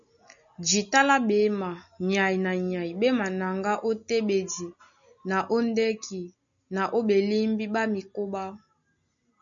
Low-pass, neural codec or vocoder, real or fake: 7.2 kHz; none; real